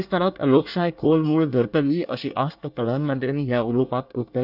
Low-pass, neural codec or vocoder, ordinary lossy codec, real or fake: 5.4 kHz; codec, 24 kHz, 1 kbps, SNAC; none; fake